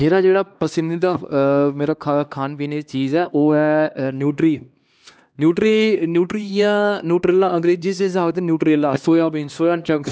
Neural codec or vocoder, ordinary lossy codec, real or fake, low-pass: codec, 16 kHz, 2 kbps, X-Codec, HuBERT features, trained on LibriSpeech; none; fake; none